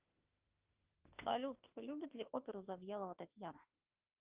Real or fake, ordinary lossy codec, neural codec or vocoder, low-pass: fake; Opus, 32 kbps; autoencoder, 48 kHz, 32 numbers a frame, DAC-VAE, trained on Japanese speech; 3.6 kHz